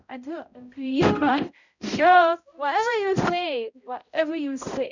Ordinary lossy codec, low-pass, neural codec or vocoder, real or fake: none; 7.2 kHz; codec, 16 kHz, 0.5 kbps, X-Codec, HuBERT features, trained on balanced general audio; fake